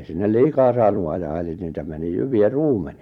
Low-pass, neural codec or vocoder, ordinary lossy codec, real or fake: 19.8 kHz; vocoder, 44.1 kHz, 128 mel bands every 256 samples, BigVGAN v2; none; fake